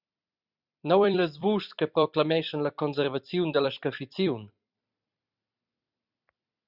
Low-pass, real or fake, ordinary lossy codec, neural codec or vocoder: 5.4 kHz; fake; Opus, 64 kbps; vocoder, 44.1 kHz, 128 mel bands every 256 samples, BigVGAN v2